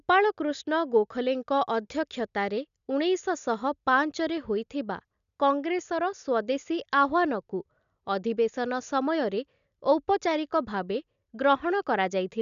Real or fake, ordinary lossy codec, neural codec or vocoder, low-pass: real; none; none; 7.2 kHz